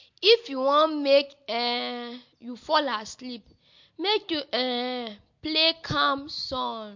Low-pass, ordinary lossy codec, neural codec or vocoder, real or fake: 7.2 kHz; MP3, 48 kbps; none; real